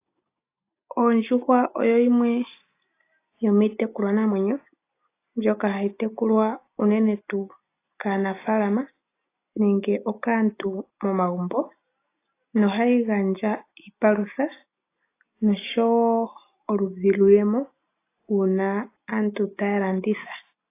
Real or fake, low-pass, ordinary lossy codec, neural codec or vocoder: real; 3.6 kHz; AAC, 24 kbps; none